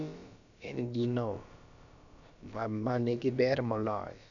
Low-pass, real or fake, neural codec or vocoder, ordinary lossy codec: 7.2 kHz; fake; codec, 16 kHz, about 1 kbps, DyCAST, with the encoder's durations; none